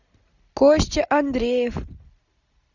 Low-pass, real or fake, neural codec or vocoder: 7.2 kHz; real; none